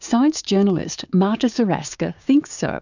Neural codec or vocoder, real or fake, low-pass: codec, 16 kHz, 6 kbps, DAC; fake; 7.2 kHz